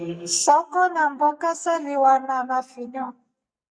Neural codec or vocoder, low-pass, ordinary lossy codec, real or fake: codec, 44.1 kHz, 2.6 kbps, SNAC; 9.9 kHz; Opus, 64 kbps; fake